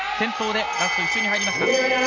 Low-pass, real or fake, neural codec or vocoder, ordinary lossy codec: 7.2 kHz; real; none; none